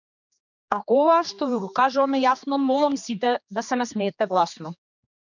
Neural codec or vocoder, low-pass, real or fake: codec, 16 kHz, 2 kbps, X-Codec, HuBERT features, trained on general audio; 7.2 kHz; fake